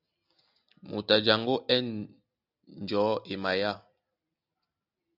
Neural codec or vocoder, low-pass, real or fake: none; 5.4 kHz; real